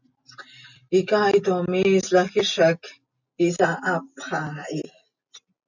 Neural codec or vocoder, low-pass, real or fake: none; 7.2 kHz; real